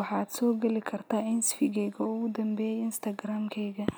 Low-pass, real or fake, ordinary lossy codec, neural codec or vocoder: none; real; none; none